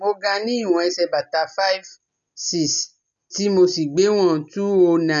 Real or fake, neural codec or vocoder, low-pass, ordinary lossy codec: real; none; 7.2 kHz; none